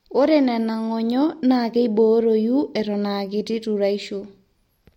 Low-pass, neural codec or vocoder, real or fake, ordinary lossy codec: 19.8 kHz; none; real; MP3, 64 kbps